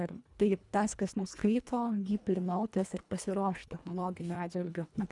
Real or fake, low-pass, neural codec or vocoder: fake; 10.8 kHz; codec, 24 kHz, 1.5 kbps, HILCodec